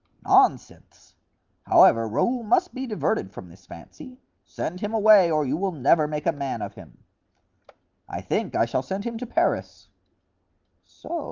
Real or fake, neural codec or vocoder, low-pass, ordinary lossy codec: real; none; 7.2 kHz; Opus, 32 kbps